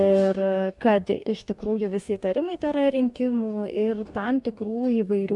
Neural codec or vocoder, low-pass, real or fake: codec, 44.1 kHz, 2.6 kbps, DAC; 10.8 kHz; fake